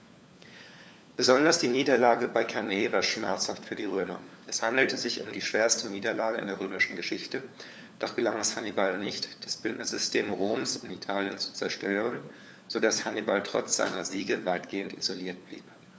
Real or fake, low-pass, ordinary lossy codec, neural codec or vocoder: fake; none; none; codec, 16 kHz, 4 kbps, FunCodec, trained on LibriTTS, 50 frames a second